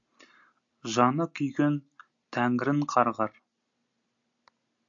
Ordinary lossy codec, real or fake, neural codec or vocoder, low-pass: AAC, 64 kbps; real; none; 7.2 kHz